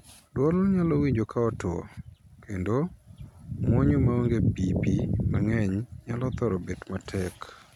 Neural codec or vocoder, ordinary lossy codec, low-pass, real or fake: none; none; 19.8 kHz; real